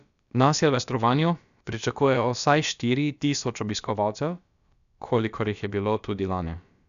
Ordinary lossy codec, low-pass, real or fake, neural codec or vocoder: none; 7.2 kHz; fake; codec, 16 kHz, about 1 kbps, DyCAST, with the encoder's durations